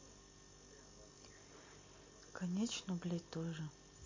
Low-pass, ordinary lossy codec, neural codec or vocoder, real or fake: 7.2 kHz; MP3, 32 kbps; none; real